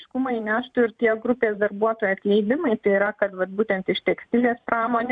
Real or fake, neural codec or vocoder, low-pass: fake; vocoder, 44.1 kHz, 128 mel bands every 512 samples, BigVGAN v2; 9.9 kHz